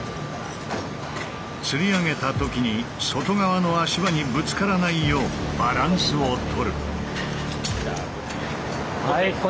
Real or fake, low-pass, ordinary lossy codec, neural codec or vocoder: real; none; none; none